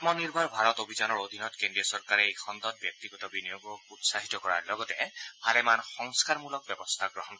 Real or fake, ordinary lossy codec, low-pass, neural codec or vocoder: real; none; none; none